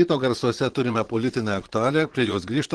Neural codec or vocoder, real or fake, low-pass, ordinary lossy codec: vocoder, 22.05 kHz, 80 mel bands, Vocos; fake; 9.9 kHz; Opus, 16 kbps